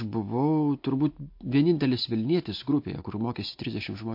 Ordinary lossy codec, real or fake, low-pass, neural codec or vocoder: MP3, 32 kbps; real; 5.4 kHz; none